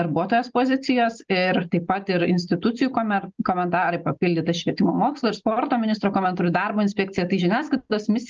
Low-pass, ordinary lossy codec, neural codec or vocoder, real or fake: 7.2 kHz; Opus, 24 kbps; none; real